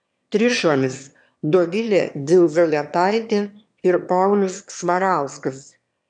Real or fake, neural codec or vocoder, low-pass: fake; autoencoder, 22.05 kHz, a latent of 192 numbers a frame, VITS, trained on one speaker; 9.9 kHz